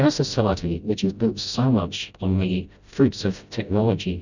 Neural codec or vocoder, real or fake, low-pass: codec, 16 kHz, 0.5 kbps, FreqCodec, smaller model; fake; 7.2 kHz